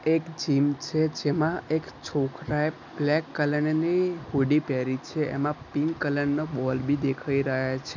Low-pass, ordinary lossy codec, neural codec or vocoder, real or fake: 7.2 kHz; none; none; real